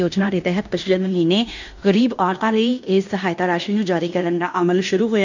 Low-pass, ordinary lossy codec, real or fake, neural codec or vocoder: 7.2 kHz; none; fake; codec, 16 kHz in and 24 kHz out, 0.9 kbps, LongCat-Audio-Codec, fine tuned four codebook decoder